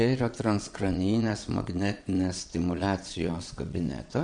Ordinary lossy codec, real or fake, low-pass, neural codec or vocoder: MP3, 96 kbps; fake; 9.9 kHz; vocoder, 22.05 kHz, 80 mel bands, Vocos